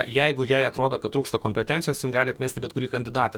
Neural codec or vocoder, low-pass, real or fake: codec, 44.1 kHz, 2.6 kbps, DAC; 19.8 kHz; fake